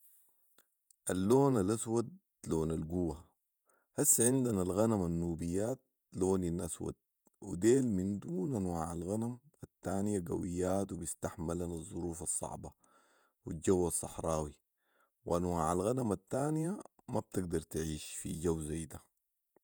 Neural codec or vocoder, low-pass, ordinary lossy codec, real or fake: vocoder, 48 kHz, 128 mel bands, Vocos; none; none; fake